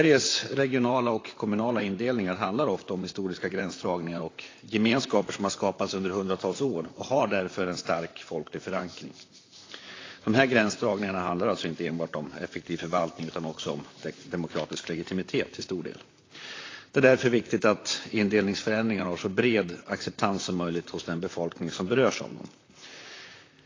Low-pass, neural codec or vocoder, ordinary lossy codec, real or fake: 7.2 kHz; vocoder, 44.1 kHz, 128 mel bands, Pupu-Vocoder; AAC, 32 kbps; fake